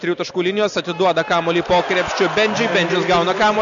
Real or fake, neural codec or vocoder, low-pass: real; none; 7.2 kHz